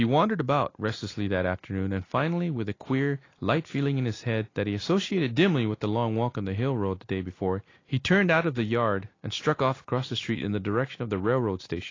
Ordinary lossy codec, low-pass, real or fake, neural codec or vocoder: AAC, 32 kbps; 7.2 kHz; real; none